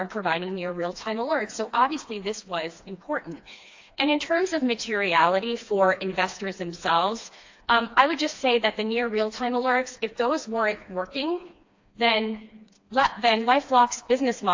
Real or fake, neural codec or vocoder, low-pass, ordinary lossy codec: fake; codec, 16 kHz, 2 kbps, FreqCodec, smaller model; 7.2 kHz; AAC, 48 kbps